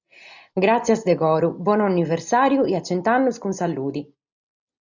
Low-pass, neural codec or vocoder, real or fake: 7.2 kHz; none; real